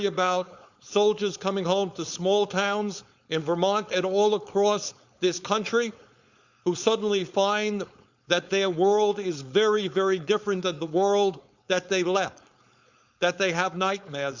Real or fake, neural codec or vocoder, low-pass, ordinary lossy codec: fake; codec, 16 kHz, 4.8 kbps, FACodec; 7.2 kHz; Opus, 64 kbps